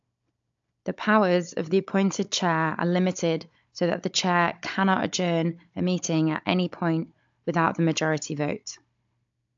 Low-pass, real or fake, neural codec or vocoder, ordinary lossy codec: 7.2 kHz; fake; codec, 16 kHz, 16 kbps, FunCodec, trained on Chinese and English, 50 frames a second; AAC, 64 kbps